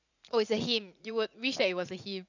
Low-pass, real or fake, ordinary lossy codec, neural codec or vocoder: 7.2 kHz; fake; none; vocoder, 44.1 kHz, 80 mel bands, Vocos